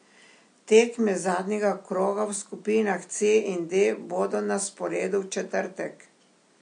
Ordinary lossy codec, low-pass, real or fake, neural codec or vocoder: MP3, 48 kbps; 9.9 kHz; real; none